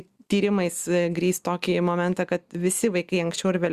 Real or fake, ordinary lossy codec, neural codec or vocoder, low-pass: real; Opus, 64 kbps; none; 14.4 kHz